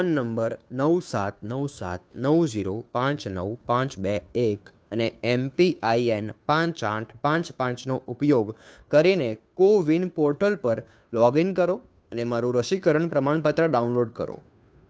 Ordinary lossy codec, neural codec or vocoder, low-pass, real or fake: none; codec, 16 kHz, 2 kbps, FunCodec, trained on Chinese and English, 25 frames a second; none; fake